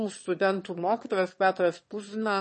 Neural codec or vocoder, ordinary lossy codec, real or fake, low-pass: autoencoder, 22.05 kHz, a latent of 192 numbers a frame, VITS, trained on one speaker; MP3, 32 kbps; fake; 9.9 kHz